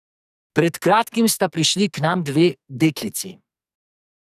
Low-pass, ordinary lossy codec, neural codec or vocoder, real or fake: 14.4 kHz; none; codec, 44.1 kHz, 2.6 kbps, SNAC; fake